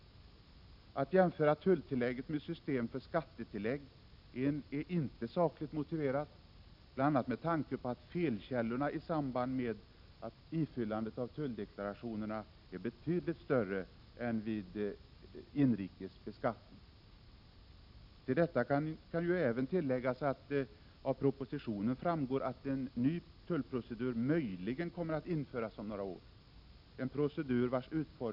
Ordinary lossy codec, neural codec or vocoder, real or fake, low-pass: none; none; real; 5.4 kHz